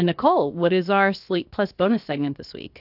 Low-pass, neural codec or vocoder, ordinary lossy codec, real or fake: 5.4 kHz; codec, 16 kHz, 0.7 kbps, FocalCodec; AAC, 48 kbps; fake